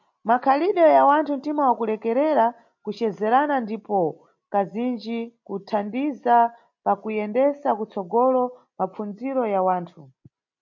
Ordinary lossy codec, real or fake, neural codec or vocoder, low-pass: MP3, 48 kbps; real; none; 7.2 kHz